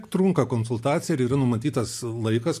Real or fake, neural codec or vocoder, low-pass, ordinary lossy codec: real; none; 14.4 kHz; MP3, 64 kbps